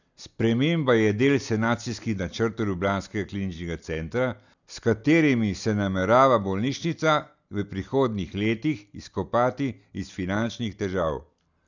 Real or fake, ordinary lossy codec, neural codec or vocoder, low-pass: real; none; none; 7.2 kHz